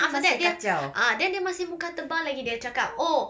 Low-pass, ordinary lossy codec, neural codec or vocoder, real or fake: none; none; none; real